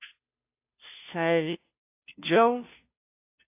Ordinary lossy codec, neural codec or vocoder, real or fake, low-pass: AAC, 32 kbps; codec, 16 kHz, 0.5 kbps, FunCodec, trained on Chinese and English, 25 frames a second; fake; 3.6 kHz